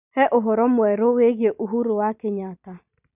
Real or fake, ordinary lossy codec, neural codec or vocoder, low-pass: real; none; none; 3.6 kHz